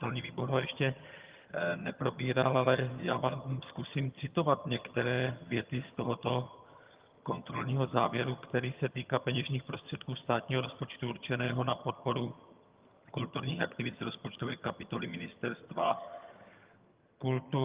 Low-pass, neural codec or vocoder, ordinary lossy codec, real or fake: 3.6 kHz; vocoder, 22.05 kHz, 80 mel bands, HiFi-GAN; Opus, 32 kbps; fake